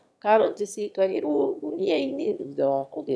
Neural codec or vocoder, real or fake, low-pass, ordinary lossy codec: autoencoder, 22.05 kHz, a latent of 192 numbers a frame, VITS, trained on one speaker; fake; none; none